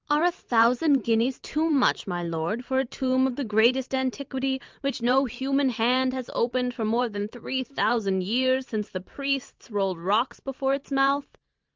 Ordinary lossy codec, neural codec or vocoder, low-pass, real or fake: Opus, 32 kbps; vocoder, 44.1 kHz, 128 mel bands every 512 samples, BigVGAN v2; 7.2 kHz; fake